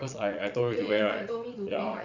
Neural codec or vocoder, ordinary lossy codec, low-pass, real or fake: vocoder, 22.05 kHz, 80 mel bands, Vocos; none; 7.2 kHz; fake